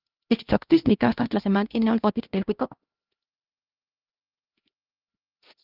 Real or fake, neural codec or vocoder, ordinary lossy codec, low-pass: fake; codec, 16 kHz, 1 kbps, X-Codec, HuBERT features, trained on LibriSpeech; Opus, 24 kbps; 5.4 kHz